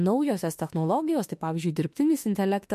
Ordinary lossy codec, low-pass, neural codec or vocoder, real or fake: MP3, 64 kbps; 14.4 kHz; autoencoder, 48 kHz, 32 numbers a frame, DAC-VAE, trained on Japanese speech; fake